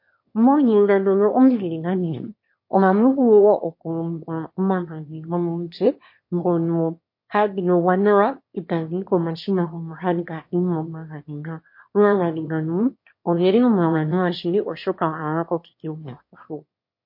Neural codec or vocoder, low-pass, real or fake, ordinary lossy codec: autoencoder, 22.05 kHz, a latent of 192 numbers a frame, VITS, trained on one speaker; 5.4 kHz; fake; MP3, 32 kbps